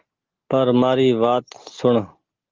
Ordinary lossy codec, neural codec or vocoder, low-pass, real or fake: Opus, 16 kbps; none; 7.2 kHz; real